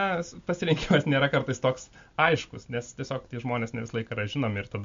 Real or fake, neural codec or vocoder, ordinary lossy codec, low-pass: real; none; MP3, 48 kbps; 7.2 kHz